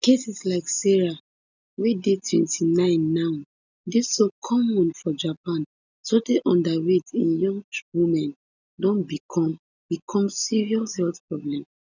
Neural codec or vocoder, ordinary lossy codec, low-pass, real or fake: none; none; 7.2 kHz; real